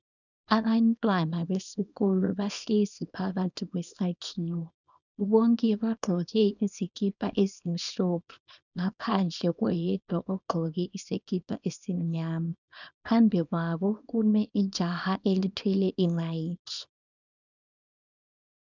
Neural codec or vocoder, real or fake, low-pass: codec, 24 kHz, 0.9 kbps, WavTokenizer, small release; fake; 7.2 kHz